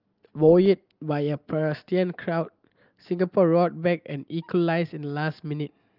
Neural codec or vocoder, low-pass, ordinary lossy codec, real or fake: none; 5.4 kHz; Opus, 24 kbps; real